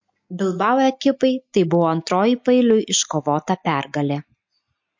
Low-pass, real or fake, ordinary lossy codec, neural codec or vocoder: 7.2 kHz; real; MP3, 48 kbps; none